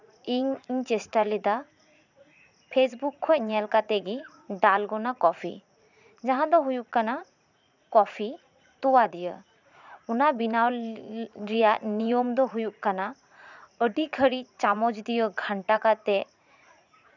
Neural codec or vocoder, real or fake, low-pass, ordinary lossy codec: vocoder, 44.1 kHz, 80 mel bands, Vocos; fake; 7.2 kHz; none